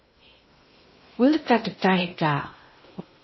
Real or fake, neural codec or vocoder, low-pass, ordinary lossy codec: fake; codec, 16 kHz in and 24 kHz out, 0.6 kbps, FocalCodec, streaming, 2048 codes; 7.2 kHz; MP3, 24 kbps